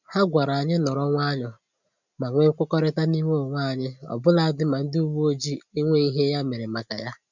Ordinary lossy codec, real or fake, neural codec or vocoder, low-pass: none; real; none; 7.2 kHz